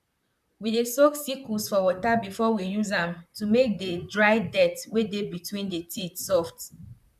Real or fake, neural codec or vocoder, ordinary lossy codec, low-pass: fake; vocoder, 44.1 kHz, 128 mel bands, Pupu-Vocoder; none; 14.4 kHz